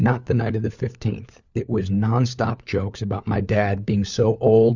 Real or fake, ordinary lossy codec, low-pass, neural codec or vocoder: fake; Opus, 64 kbps; 7.2 kHz; codec, 16 kHz, 4 kbps, FunCodec, trained on LibriTTS, 50 frames a second